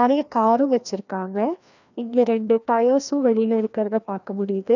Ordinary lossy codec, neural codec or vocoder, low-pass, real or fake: none; codec, 16 kHz, 1 kbps, FreqCodec, larger model; 7.2 kHz; fake